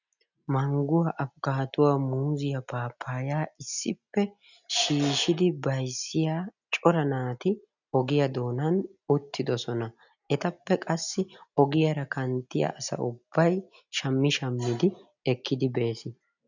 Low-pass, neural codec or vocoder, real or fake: 7.2 kHz; none; real